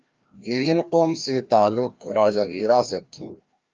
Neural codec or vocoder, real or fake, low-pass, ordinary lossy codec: codec, 16 kHz, 1 kbps, FreqCodec, larger model; fake; 7.2 kHz; Opus, 24 kbps